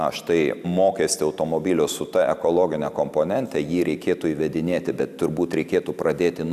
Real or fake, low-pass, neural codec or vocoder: real; 14.4 kHz; none